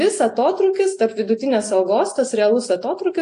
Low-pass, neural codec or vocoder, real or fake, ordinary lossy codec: 10.8 kHz; none; real; AAC, 48 kbps